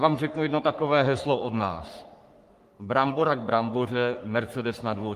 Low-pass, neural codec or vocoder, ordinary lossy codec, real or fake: 14.4 kHz; codec, 44.1 kHz, 3.4 kbps, Pupu-Codec; Opus, 32 kbps; fake